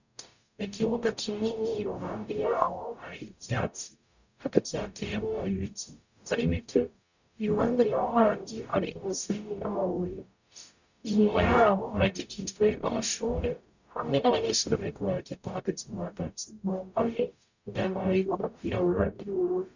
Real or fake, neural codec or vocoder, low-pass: fake; codec, 44.1 kHz, 0.9 kbps, DAC; 7.2 kHz